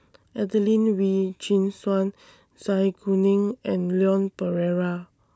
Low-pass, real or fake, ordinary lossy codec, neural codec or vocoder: none; real; none; none